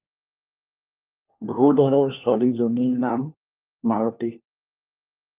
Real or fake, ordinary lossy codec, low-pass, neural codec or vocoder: fake; Opus, 24 kbps; 3.6 kHz; codec, 16 kHz, 1 kbps, FunCodec, trained on LibriTTS, 50 frames a second